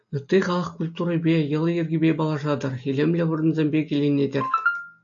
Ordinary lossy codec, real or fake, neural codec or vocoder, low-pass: AAC, 64 kbps; real; none; 7.2 kHz